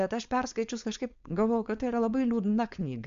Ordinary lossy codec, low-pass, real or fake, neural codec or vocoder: MP3, 64 kbps; 7.2 kHz; fake; codec, 16 kHz, 4.8 kbps, FACodec